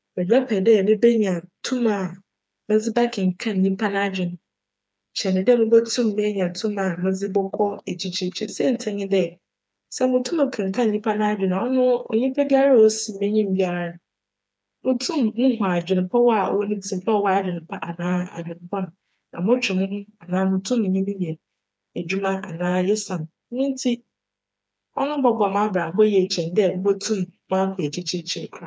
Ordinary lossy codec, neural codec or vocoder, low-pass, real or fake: none; codec, 16 kHz, 4 kbps, FreqCodec, smaller model; none; fake